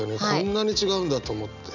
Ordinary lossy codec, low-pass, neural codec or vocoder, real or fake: none; 7.2 kHz; none; real